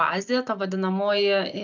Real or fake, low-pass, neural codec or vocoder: real; 7.2 kHz; none